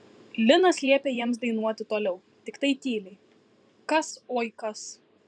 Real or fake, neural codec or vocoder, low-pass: fake; vocoder, 44.1 kHz, 128 mel bands every 512 samples, BigVGAN v2; 9.9 kHz